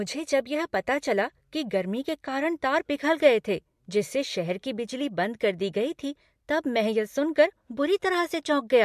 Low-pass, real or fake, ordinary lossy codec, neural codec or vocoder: 14.4 kHz; real; MP3, 64 kbps; none